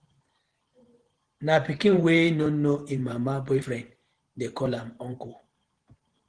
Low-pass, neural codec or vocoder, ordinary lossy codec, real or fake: 9.9 kHz; vocoder, 44.1 kHz, 128 mel bands every 512 samples, BigVGAN v2; Opus, 16 kbps; fake